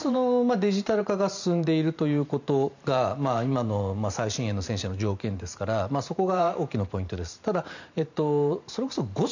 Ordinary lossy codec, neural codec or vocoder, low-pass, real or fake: none; vocoder, 44.1 kHz, 128 mel bands every 512 samples, BigVGAN v2; 7.2 kHz; fake